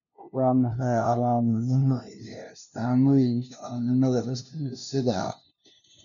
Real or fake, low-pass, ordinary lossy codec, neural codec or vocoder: fake; 7.2 kHz; none; codec, 16 kHz, 0.5 kbps, FunCodec, trained on LibriTTS, 25 frames a second